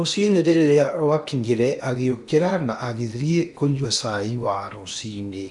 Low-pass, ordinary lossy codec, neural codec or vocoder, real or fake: 10.8 kHz; none; codec, 16 kHz in and 24 kHz out, 0.8 kbps, FocalCodec, streaming, 65536 codes; fake